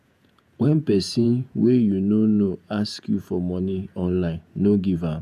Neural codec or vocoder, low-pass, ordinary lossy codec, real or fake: vocoder, 48 kHz, 128 mel bands, Vocos; 14.4 kHz; none; fake